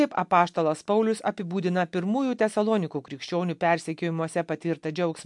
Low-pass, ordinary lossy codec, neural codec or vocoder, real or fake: 10.8 kHz; MP3, 64 kbps; none; real